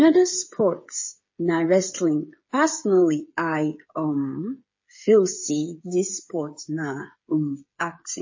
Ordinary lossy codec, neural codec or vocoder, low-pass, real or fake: MP3, 32 kbps; codec, 16 kHz, 8 kbps, FreqCodec, smaller model; 7.2 kHz; fake